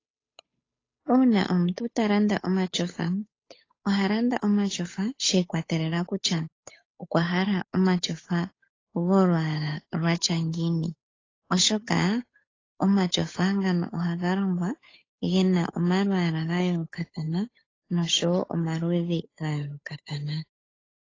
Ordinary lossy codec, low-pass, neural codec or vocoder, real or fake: AAC, 32 kbps; 7.2 kHz; codec, 16 kHz, 8 kbps, FunCodec, trained on Chinese and English, 25 frames a second; fake